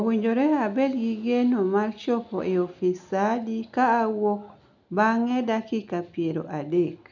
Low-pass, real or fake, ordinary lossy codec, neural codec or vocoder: 7.2 kHz; real; none; none